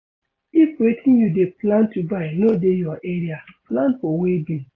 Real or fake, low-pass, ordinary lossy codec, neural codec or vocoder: real; 7.2 kHz; AAC, 32 kbps; none